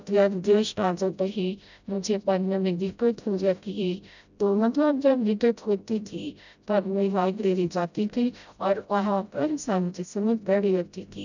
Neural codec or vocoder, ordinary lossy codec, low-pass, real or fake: codec, 16 kHz, 0.5 kbps, FreqCodec, smaller model; none; 7.2 kHz; fake